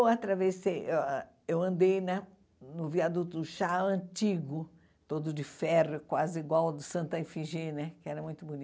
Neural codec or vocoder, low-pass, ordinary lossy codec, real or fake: none; none; none; real